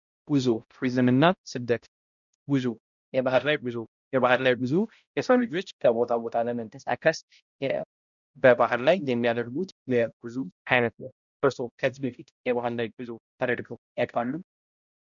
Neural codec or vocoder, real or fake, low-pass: codec, 16 kHz, 0.5 kbps, X-Codec, HuBERT features, trained on balanced general audio; fake; 7.2 kHz